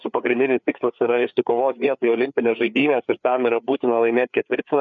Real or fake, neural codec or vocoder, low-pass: fake; codec, 16 kHz, 4 kbps, FreqCodec, larger model; 7.2 kHz